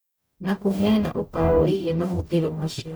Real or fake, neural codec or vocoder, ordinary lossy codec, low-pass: fake; codec, 44.1 kHz, 0.9 kbps, DAC; none; none